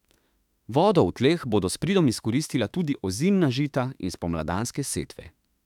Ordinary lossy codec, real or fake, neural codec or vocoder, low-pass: none; fake; autoencoder, 48 kHz, 32 numbers a frame, DAC-VAE, trained on Japanese speech; 19.8 kHz